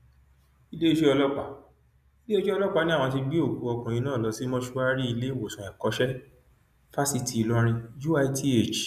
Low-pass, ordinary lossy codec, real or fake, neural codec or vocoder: 14.4 kHz; none; real; none